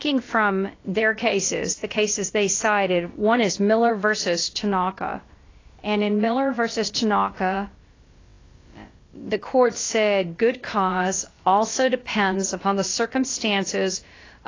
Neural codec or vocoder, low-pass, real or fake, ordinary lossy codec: codec, 16 kHz, about 1 kbps, DyCAST, with the encoder's durations; 7.2 kHz; fake; AAC, 32 kbps